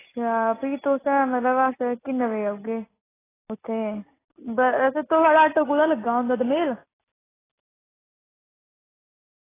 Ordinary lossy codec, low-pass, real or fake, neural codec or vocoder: AAC, 16 kbps; 3.6 kHz; real; none